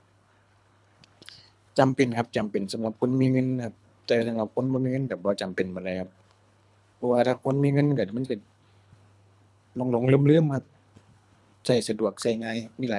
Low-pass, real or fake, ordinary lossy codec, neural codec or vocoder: none; fake; none; codec, 24 kHz, 3 kbps, HILCodec